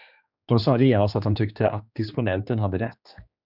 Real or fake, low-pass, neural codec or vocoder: fake; 5.4 kHz; codec, 16 kHz, 2 kbps, X-Codec, HuBERT features, trained on general audio